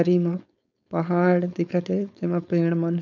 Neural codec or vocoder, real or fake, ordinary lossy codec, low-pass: codec, 16 kHz, 4.8 kbps, FACodec; fake; none; 7.2 kHz